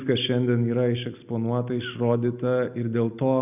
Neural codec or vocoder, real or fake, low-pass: none; real; 3.6 kHz